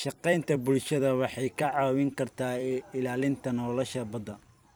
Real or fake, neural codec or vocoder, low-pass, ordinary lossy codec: fake; vocoder, 44.1 kHz, 128 mel bands every 256 samples, BigVGAN v2; none; none